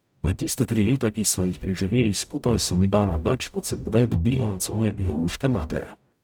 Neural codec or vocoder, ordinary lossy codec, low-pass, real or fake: codec, 44.1 kHz, 0.9 kbps, DAC; none; none; fake